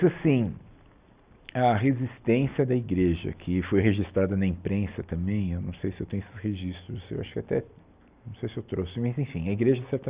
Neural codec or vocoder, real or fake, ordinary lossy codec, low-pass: none; real; Opus, 64 kbps; 3.6 kHz